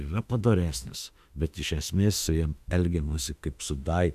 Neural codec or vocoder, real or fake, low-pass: autoencoder, 48 kHz, 32 numbers a frame, DAC-VAE, trained on Japanese speech; fake; 14.4 kHz